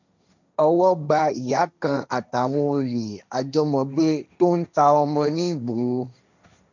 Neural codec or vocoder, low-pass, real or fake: codec, 16 kHz, 1.1 kbps, Voila-Tokenizer; 7.2 kHz; fake